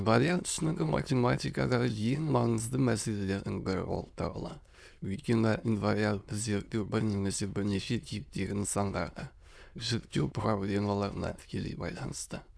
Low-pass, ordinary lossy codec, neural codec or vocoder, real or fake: none; none; autoencoder, 22.05 kHz, a latent of 192 numbers a frame, VITS, trained on many speakers; fake